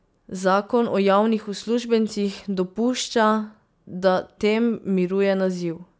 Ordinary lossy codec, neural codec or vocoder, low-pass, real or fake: none; none; none; real